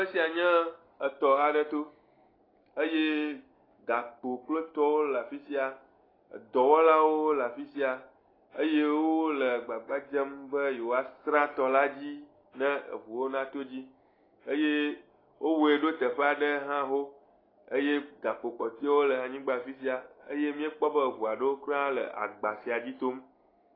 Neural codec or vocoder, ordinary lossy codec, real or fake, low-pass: none; AAC, 24 kbps; real; 5.4 kHz